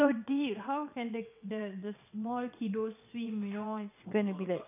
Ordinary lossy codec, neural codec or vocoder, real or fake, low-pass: none; vocoder, 22.05 kHz, 80 mel bands, WaveNeXt; fake; 3.6 kHz